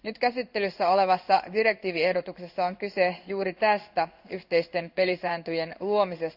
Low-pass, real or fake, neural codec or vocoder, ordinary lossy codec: 5.4 kHz; fake; codec, 16 kHz in and 24 kHz out, 1 kbps, XY-Tokenizer; none